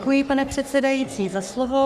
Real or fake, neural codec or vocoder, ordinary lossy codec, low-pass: fake; codec, 44.1 kHz, 3.4 kbps, Pupu-Codec; Opus, 64 kbps; 14.4 kHz